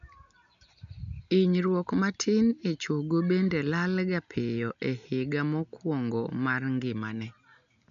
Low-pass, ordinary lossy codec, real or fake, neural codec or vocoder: 7.2 kHz; none; real; none